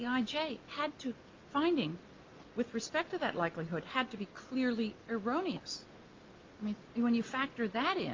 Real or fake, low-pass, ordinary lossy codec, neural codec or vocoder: real; 7.2 kHz; Opus, 32 kbps; none